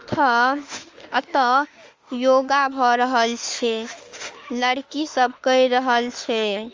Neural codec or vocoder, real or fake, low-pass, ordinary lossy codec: autoencoder, 48 kHz, 32 numbers a frame, DAC-VAE, trained on Japanese speech; fake; 7.2 kHz; Opus, 32 kbps